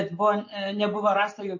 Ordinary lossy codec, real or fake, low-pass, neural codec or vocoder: MP3, 32 kbps; real; 7.2 kHz; none